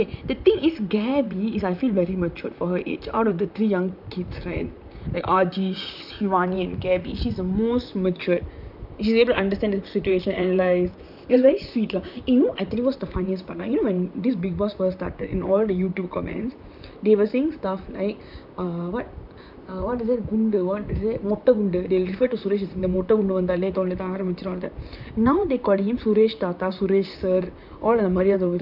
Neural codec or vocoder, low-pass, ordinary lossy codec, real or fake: vocoder, 44.1 kHz, 128 mel bands, Pupu-Vocoder; 5.4 kHz; none; fake